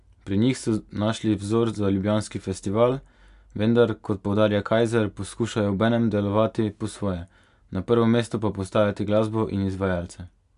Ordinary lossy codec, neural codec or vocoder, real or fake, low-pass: AAC, 96 kbps; none; real; 10.8 kHz